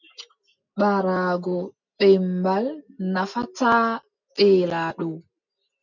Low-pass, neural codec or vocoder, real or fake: 7.2 kHz; none; real